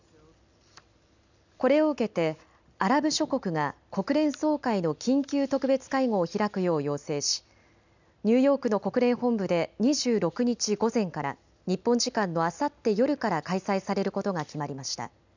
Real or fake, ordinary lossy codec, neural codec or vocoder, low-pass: real; none; none; 7.2 kHz